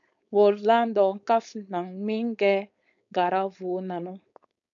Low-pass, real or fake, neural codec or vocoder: 7.2 kHz; fake; codec, 16 kHz, 4.8 kbps, FACodec